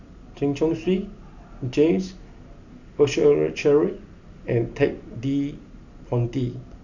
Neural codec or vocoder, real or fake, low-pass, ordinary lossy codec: none; real; 7.2 kHz; none